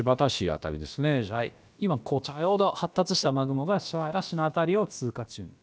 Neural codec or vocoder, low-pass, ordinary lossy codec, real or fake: codec, 16 kHz, about 1 kbps, DyCAST, with the encoder's durations; none; none; fake